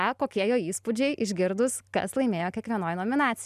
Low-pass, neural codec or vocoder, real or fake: 14.4 kHz; none; real